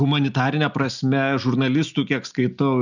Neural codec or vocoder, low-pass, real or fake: none; 7.2 kHz; real